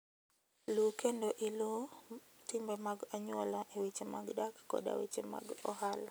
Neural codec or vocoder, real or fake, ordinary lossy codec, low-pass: none; real; none; none